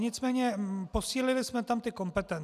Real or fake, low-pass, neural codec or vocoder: real; 14.4 kHz; none